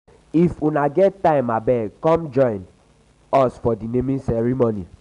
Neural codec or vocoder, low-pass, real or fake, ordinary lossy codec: none; 10.8 kHz; real; none